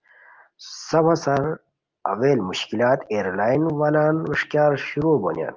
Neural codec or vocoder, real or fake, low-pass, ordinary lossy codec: none; real; 7.2 kHz; Opus, 24 kbps